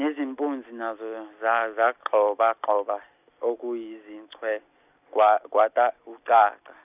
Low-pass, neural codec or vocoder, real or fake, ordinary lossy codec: 3.6 kHz; none; real; none